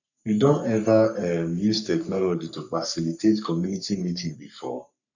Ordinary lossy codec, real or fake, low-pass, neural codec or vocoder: none; fake; 7.2 kHz; codec, 44.1 kHz, 3.4 kbps, Pupu-Codec